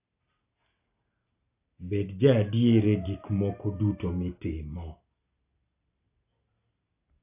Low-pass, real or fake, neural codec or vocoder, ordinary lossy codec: 3.6 kHz; real; none; AAC, 32 kbps